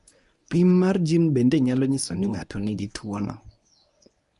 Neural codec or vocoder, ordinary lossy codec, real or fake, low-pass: codec, 24 kHz, 0.9 kbps, WavTokenizer, medium speech release version 1; none; fake; 10.8 kHz